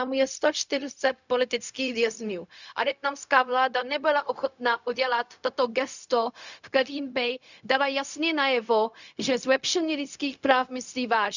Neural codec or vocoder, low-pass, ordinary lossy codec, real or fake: codec, 16 kHz, 0.4 kbps, LongCat-Audio-Codec; 7.2 kHz; none; fake